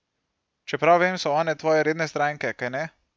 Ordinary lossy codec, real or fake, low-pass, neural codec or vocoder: none; real; none; none